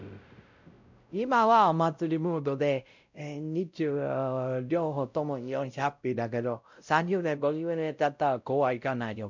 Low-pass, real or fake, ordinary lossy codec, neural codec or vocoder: 7.2 kHz; fake; MP3, 64 kbps; codec, 16 kHz, 0.5 kbps, X-Codec, WavLM features, trained on Multilingual LibriSpeech